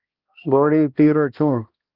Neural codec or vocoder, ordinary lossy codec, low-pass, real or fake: codec, 16 kHz, 1 kbps, X-Codec, HuBERT features, trained on balanced general audio; Opus, 24 kbps; 5.4 kHz; fake